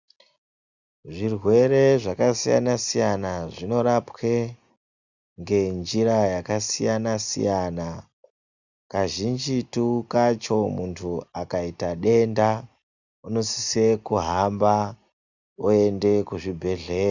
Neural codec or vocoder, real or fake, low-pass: none; real; 7.2 kHz